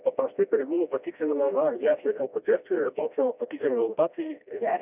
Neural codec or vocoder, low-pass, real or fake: codec, 16 kHz, 1 kbps, FreqCodec, smaller model; 3.6 kHz; fake